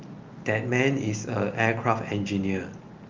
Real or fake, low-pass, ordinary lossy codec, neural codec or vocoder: real; 7.2 kHz; Opus, 24 kbps; none